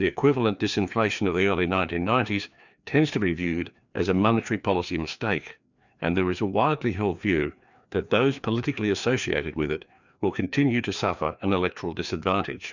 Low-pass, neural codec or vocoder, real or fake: 7.2 kHz; codec, 16 kHz, 2 kbps, FreqCodec, larger model; fake